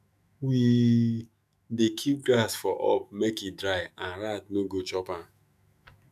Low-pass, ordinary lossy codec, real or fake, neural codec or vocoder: 14.4 kHz; none; fake; autoencoder, 48 kHz, 128 numbers a frame, DAC-VAE, trained on Japanese speech